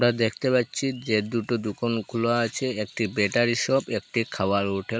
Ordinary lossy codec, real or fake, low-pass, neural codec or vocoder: none; real; none; none